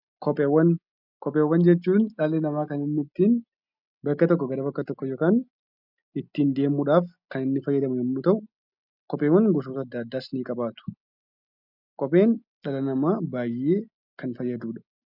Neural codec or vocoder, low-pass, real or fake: none; 5.4 kHz; real